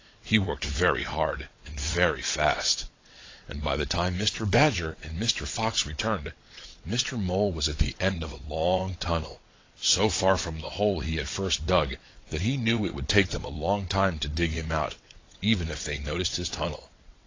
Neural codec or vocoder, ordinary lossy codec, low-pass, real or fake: vocoder, 22.05 kHz, 80 mel bands, WaveNeXt; AAC, 32 kbps; 7.2 kHz; fake